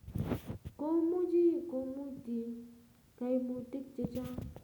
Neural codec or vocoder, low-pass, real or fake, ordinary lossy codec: none; none; real; none